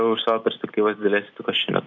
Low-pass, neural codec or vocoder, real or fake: 7.2 kHz; none; real